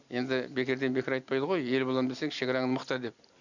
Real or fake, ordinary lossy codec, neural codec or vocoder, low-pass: real; none; none; 7.2 kHz